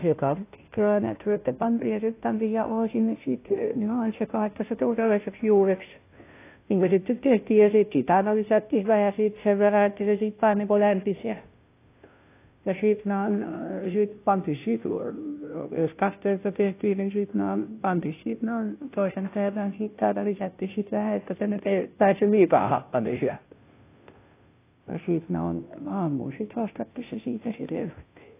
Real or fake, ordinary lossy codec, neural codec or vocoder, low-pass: fake; AAC, 24 kbps; codec, 16 kHz, 0.5 kbps, FunCodec, trained on Chinese and English, 25 frames a second; 3.6 kHz